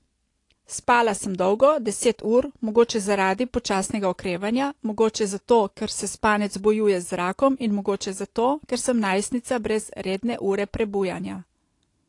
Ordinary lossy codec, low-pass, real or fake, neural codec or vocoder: AAC, 48 kbps; 10.8 kHz; real; none